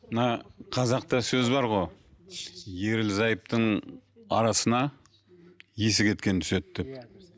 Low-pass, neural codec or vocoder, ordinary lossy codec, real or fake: none; none; none; real